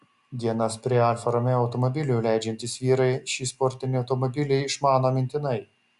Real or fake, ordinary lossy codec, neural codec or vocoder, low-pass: real; MP3, 96 kbps; none; 10.8 kHz